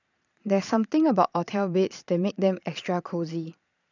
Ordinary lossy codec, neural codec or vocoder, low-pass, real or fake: none; vocoder, 22.05 kHz, 80 mel bands, Vocos; 7.2 kHz; fake